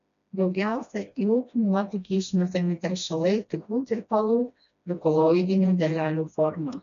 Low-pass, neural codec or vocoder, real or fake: 7.2 kHz; codec, 16 kHz, 1 kbps, FreqCodec, smaller model; fake